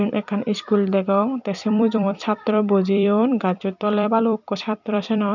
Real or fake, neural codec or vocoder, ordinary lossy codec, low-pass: fake; vocoder, 44.1 kHz, 128 mel bands every 256 samples, BigVGAN v2; none; 7.2 kHz